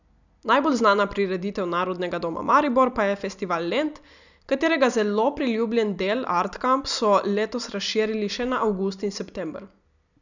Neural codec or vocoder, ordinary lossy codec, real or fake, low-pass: none; none; real; 7.2 kHz